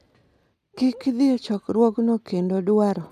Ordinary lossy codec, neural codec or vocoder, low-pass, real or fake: none; none; 14.4 kHz; real